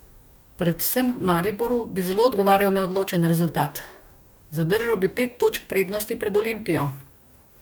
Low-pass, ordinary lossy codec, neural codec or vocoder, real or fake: none; none; codec, 44.1 kHz, 2.6 kbps, DAC; fake